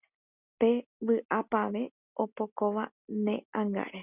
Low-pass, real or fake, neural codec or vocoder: 3.6 kHz; real; none